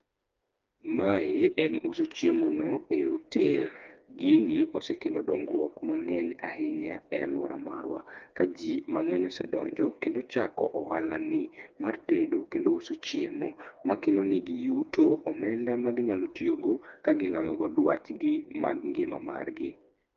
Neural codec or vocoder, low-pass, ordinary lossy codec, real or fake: codec, 16 kHz, 2 kbps, FreqCodec, smaller model; 7.2 kHz; Opus, 24 kbps; fake